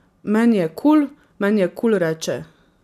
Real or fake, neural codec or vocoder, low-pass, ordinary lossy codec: real; none; 14.4 kHz; none